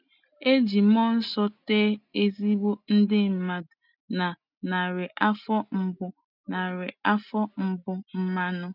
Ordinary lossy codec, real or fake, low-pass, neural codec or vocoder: none; real; 5.4 kHz; none